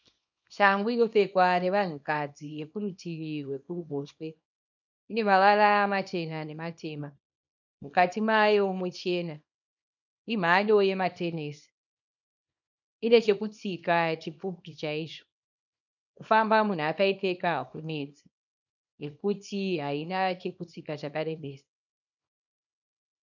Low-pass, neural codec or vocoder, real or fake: 7.2 kHz; codec, 24 kHz, 0.9 kbps, WavTokenizer, small release; fake